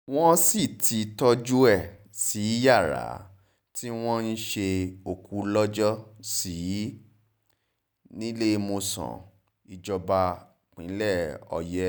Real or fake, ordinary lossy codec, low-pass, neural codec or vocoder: real; none; none; none